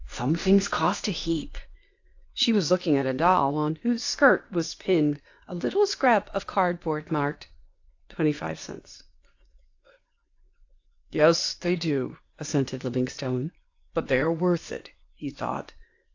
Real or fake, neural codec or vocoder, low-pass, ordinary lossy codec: fake; codec, 16 kHz, 0.8 kbps, ZipCodec; 7.2 kHz; AAC, 48 kbps